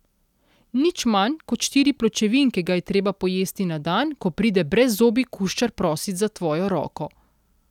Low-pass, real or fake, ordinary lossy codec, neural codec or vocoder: 19.8 kHz; real; none; none